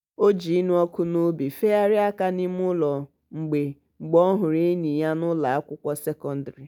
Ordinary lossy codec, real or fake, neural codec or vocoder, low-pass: none; real; none; 19.8 kHz